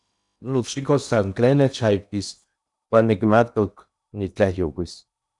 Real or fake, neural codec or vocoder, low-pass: fake; codec, 16 kHz in and 24 kHz out, 0.8 kbps, FocalCodec, streaming, 65536 codes; 10.8 kHz